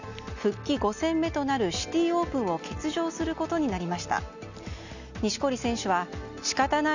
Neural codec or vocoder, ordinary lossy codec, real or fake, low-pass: none; none; real; 7.2 kHz